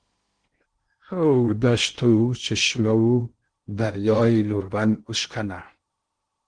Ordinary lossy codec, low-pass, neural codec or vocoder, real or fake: Opus, 16 kbps; 9.9 kHz; codec, 16 kHz in and 24 kHz out, 0.6 kbps, FocalCodec, streaming, 2048 codes; fake